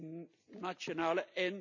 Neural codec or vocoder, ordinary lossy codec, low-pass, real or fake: none; none; 7.2 kHz; real